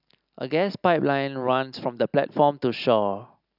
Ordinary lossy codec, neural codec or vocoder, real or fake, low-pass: none; none; real; 5.4 kHz